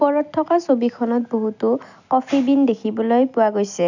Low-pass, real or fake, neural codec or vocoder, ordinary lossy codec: 7.2 kHz; real; none; none